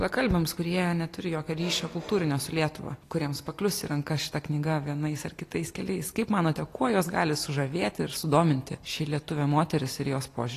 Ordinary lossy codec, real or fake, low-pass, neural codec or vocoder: AAC, 48 kbps; real; 14.4 kHz; none